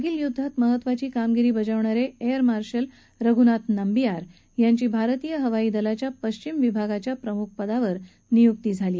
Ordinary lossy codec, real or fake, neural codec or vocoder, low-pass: none; real; none; none